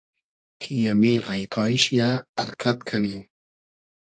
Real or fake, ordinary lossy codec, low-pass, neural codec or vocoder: fake; AAC, 64 kbps; 9.9 kHz; codec, 24 kHz, 0.9 kbps, WavTokenizer, medium music audio release